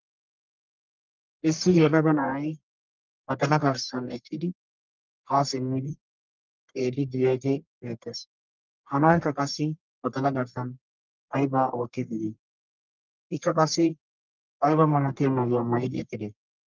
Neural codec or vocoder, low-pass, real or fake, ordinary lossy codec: codec, 44.1 kHz, 1.7 kbps, Pupu-Codec; 7.2 kHz; fake; Opus, 24 kbps